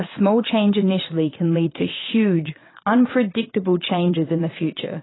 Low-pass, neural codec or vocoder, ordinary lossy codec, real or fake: 7.2 kHz; vocoder, 22.05 kHz, 80 mel bands, WaveNeXt; AAC, 16 kbps; fake